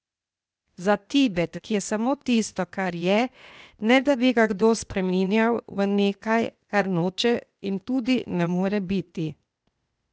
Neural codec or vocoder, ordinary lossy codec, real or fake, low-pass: codec, 16 kHz, 0.8 kbps, ZipCodec; none; fake; none